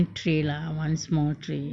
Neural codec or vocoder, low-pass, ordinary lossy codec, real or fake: none; 9.9 kHz; none; real